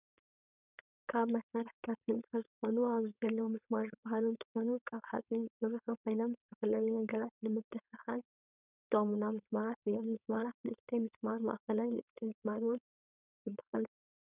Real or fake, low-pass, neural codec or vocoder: fake; 3.6 kHz; codec, 16 kHz, 4.8 kbps, FACodec